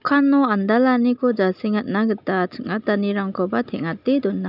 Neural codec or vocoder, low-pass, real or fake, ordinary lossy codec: none; 5.4 kHz; real; none